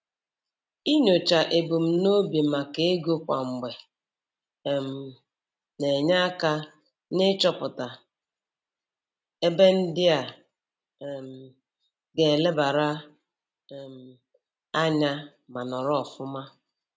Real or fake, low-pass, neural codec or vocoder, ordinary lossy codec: real; none; none; none